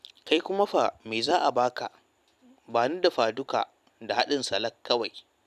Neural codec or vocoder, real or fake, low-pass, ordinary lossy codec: vocoder, 44.1 kHz, 128 mel bands every 256 samples, BigVGAN v2; fake; 14.4 kHz; none